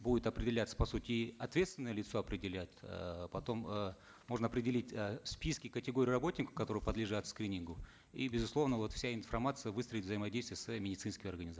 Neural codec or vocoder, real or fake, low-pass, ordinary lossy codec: none; real; none; none